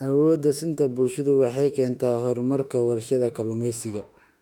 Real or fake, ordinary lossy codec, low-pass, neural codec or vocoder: fake; none; 19.8 kHz; autoencoder, 48 kHz, 32 numbers a frame, DAC-VAE, trained on Japanese speech